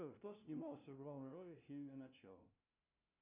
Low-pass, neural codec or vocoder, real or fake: 3.6 kHz; codec, 16 kHz, 0.5 kbps, FunCodec, trained on LibriTTS, 25 frames a second; fake